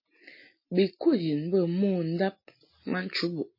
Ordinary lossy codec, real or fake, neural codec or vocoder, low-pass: MP3, 24 kbps; real; none; 5.4 kHz